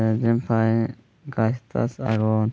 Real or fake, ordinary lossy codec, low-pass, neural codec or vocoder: real; none; none; none